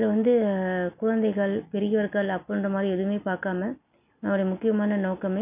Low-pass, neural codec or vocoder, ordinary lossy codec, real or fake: 3.6 kHz; none; none; real